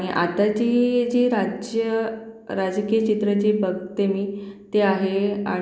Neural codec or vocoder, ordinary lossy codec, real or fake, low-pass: none; none; real; none